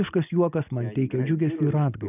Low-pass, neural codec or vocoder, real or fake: 3.6 kHz; none; real